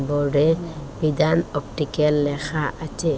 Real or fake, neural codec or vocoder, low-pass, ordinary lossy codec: real; none; none; none